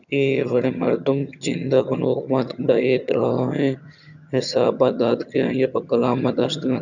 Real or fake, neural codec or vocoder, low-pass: fake; vocoder, 22.05 kHz, 80 mel bands, HiFi-GAN; 7.2 kHz